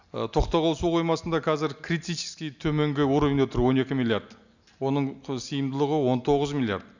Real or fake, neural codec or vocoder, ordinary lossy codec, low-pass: real; none; none; 7.2 kHz